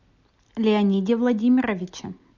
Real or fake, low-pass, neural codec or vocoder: real; 7.2 kHz; none